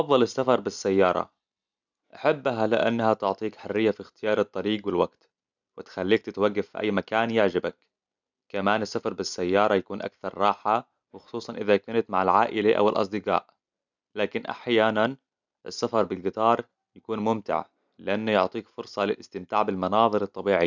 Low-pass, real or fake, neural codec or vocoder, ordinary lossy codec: 7.2 kHz; real; none; none